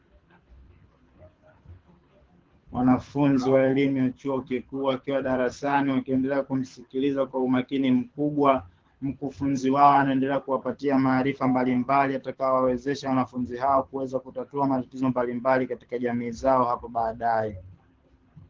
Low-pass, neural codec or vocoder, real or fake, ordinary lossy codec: 7.2 kHz; codec, 24 kHz, 6 kbps, HILCodec; fake; Opus, 16 kbps